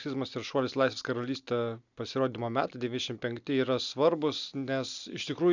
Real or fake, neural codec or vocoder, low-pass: real; none; 7.2 kHz